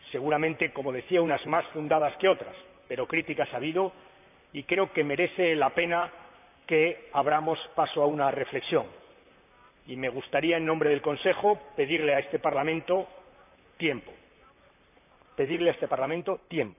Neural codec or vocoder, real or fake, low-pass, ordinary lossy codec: vocoder, 44.1 kHz, 128 mel bands, Pupu-Vocoder; fake; 3.6 kHz; none